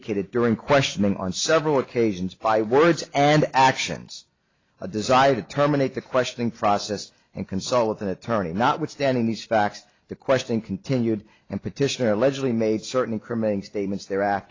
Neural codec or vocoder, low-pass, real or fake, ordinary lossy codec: none; 7.2 kHz; real; AAC, 32 kbps